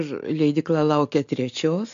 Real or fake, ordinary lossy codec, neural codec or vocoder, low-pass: real; AAC, 64 kbps; none; 7.2 kHz